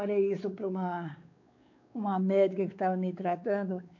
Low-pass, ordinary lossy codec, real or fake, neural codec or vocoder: 7.2 kHz; AAC, 48 kbps; fake; codec, 16 kHz, 4 kbps, X-Codec, WavLM features, trained on Multilingual LibriSpeech